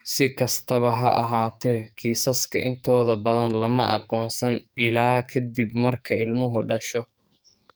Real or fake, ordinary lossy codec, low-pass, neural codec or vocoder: fake; none; none; codec, 44.1 kHz, 2.6 kbps, SNAC